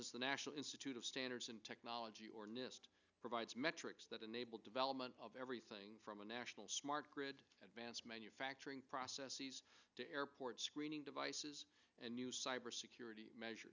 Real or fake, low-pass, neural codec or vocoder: real; 7.2 kHz; none